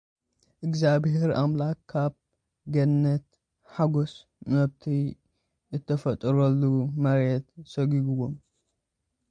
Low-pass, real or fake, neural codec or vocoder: 9.9 kHz; real; none